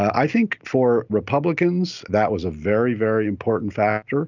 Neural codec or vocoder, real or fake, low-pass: none; real; 7.2 kHz